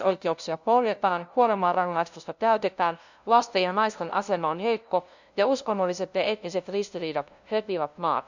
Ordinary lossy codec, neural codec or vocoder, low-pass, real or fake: none; codec, 16 kHz, 0.5 kbps, FunCodec, trained on LibriTTS, 25 frames a second; 7.2 kHz; fake